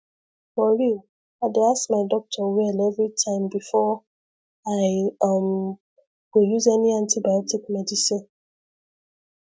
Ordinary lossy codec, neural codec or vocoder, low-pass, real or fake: none; none; none; real